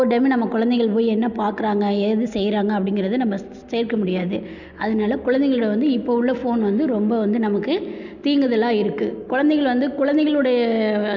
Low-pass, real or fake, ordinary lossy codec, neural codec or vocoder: 7.2 kHz; real; none; none